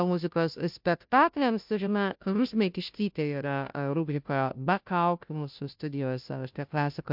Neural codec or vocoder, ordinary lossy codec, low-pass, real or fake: codec, 16 kHz, 0.5 kbps, FunCodec, trained on Chinese and English, 25 frames a second; MP3, 48 kbps; 5.4 kHz; fake